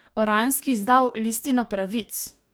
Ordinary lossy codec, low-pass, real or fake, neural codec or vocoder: none; none; fake; codec, 44.1 kHz, 2.6 kbps, DAC